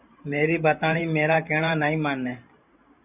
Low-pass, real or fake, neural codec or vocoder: 3.6 kHz; fake; vocoder, 44.1 kHz, 128 mel bands every 512 samples, BigVGAN v2